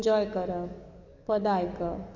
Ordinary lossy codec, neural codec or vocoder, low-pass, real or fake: MP3, 64 kbps; codec, 44.1 kHz, 7.8 kbps, DAC; 7.2 kHz; fake